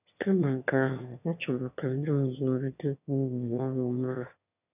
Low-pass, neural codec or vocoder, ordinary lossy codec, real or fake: 3.6 kHz; autoencoder, 22.05 kHz, a latent of 192 numbers a frame, VITS, trained on one speaker; none; fake